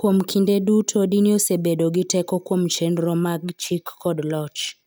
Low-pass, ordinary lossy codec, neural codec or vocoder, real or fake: none; none; none; real